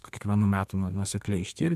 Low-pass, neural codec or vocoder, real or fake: 14.4 kHz; codec, 32 kHz, 1.9 kbps, SNAC; fake